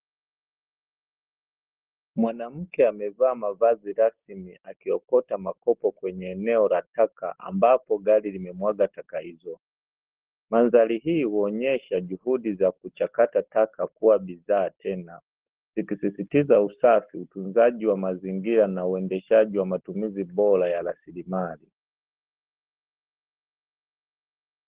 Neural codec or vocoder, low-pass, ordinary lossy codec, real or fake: none; 3.6 kHz; Opus, 16 kbps; real